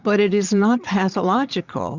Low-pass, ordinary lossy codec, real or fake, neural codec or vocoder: 7.2 kHz; Opus, 64 kbps; fake; vocoder, 22.05 kHz, 80 mel bands, Vocos